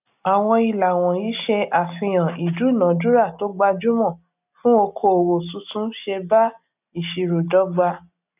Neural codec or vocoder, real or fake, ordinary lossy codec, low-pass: none; real; none; 3.6 kHz